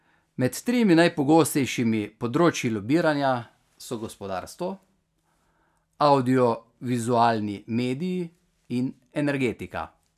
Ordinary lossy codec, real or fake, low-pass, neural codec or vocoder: none; real; 14.4 kHz; none